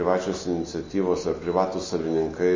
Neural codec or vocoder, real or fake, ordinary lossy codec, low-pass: none; real; MP3, 32 kbps; 7.2 kHz